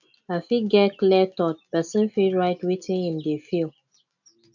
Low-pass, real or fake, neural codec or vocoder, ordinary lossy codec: 7.2 kHz; real; none; none